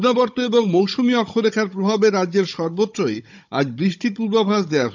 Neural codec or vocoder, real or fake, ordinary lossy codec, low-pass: codec, 16 kHz, 16 kbps, FunCodec, trained on Chinese and English, 50 frames a second; fake; none; 7.2 kHz